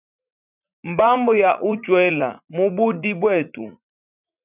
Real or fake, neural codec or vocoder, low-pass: fake; vocoder, 44.1 kHz, 80 mel bands, Vocos; 3.6 kHz